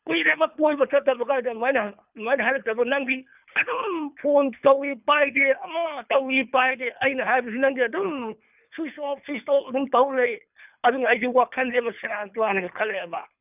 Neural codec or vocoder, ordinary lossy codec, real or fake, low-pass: codec, 24 kHz, 3 kbps, HILCodec; none; fake; 3.6 kHz